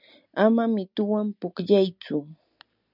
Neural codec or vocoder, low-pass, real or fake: none; 5.4 kHz; real